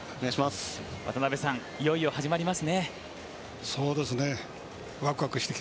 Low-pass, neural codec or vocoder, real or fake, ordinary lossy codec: none; none; real; none